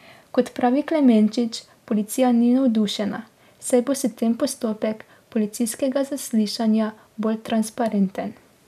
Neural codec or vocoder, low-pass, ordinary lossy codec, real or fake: none; 14.4 kHz; none; real